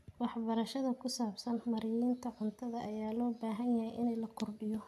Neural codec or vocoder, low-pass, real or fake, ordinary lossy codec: none; 14.4 kHz; real; AAC, 96 kbps